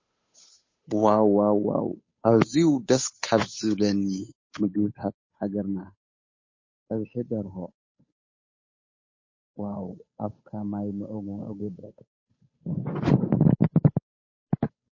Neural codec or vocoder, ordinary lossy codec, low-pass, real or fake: codec, 16 kHz, 8 kbps, FunCodec, trained on Chinese and English, 25 frames a second; MP3, 32 kbps; 7.2 kHz; fake